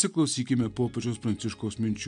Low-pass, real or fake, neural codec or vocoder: 9.9 kHz; real; none